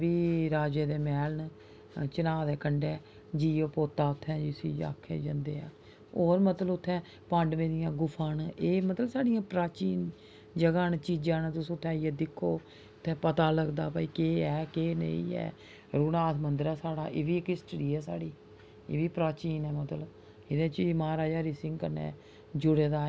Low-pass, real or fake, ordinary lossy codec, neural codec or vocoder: none; real; none; none